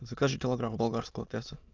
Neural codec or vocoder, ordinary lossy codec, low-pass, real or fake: autoencoder, 22.05 kHz, a latent of 192 numbers a frame, VITS, trained on many speakers; Opus, 24 kbps; 7.2 kHz; fake